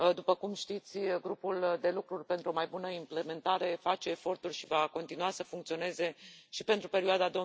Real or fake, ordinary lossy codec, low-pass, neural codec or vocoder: real; none; none; none